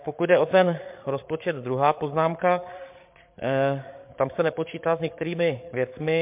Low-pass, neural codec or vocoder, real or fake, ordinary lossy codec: 3.6 kHz; codec, 16 kHz, 8 kbps, FreqCodec, larger model; fake; MP3, 32 kbps